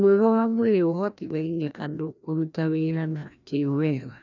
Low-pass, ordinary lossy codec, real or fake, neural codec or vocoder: 7.2 kHz; none; fake; codec, 16 kHz, 1 kbps, FreqCodec, larger model